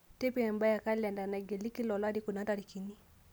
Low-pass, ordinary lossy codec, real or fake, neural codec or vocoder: none; none; real; none